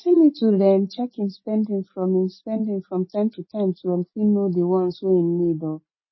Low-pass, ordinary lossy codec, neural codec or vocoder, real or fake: 7.2 kHz; MP3, 24 kbps; none; real